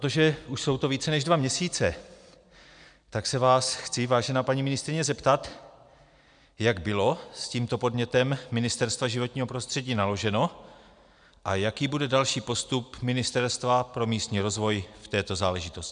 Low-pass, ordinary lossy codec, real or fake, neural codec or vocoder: 9.9 kHz; MP3, 96 kbps; real; none